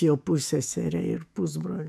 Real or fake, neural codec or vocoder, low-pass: fake; autoencoder, 48 kHz, 128 numbers a frame, DAC-VAE, trained on Japanese speech; 14.4 kHz